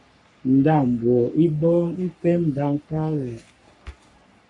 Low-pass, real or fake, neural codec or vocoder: 10.8 kHz; fake; codec, 44.1 kHz, 3.4 kbps, Pupu-Codec